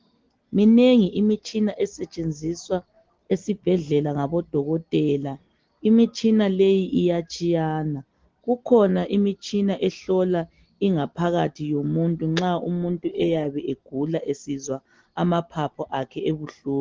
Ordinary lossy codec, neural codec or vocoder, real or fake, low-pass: Opus, 16 kbps; none; real; 7.2 kHz